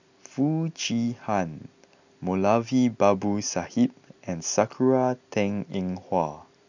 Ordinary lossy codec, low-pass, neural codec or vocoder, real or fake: none; 7.2 kHz; none; real